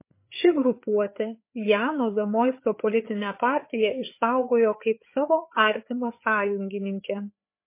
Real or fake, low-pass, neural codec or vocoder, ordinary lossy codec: fake; 3.6 kHz; codec, 16 kHz, 4 kbps, FreqCodec, larger model; MP3, 24 kbps